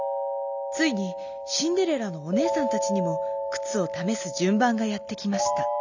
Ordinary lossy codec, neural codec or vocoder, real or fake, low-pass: none; none; real; 7.2 kHz